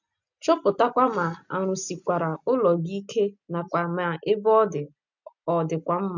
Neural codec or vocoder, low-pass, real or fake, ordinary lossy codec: none; 7.2 kHz; real; none